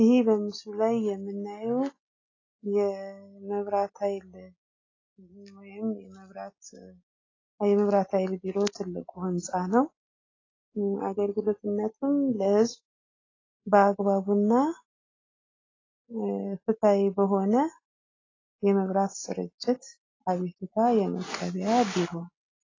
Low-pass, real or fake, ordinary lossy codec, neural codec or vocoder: 7.2 kHz; real; AAC, 32 kbps; none